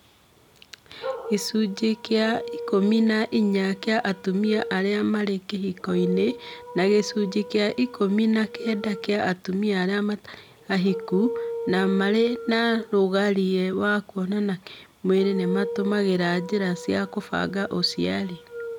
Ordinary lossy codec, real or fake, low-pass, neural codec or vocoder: none; real; 19.8 kHz; none